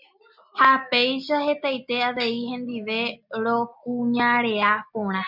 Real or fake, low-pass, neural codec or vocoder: real; 5.4 kHz; none